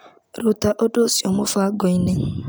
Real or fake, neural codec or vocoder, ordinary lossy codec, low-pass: real; none; none; none